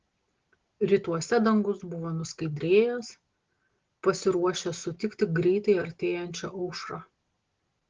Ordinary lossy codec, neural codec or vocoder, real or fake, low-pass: Opus, 16 kbps; none; real; 7.2 kHz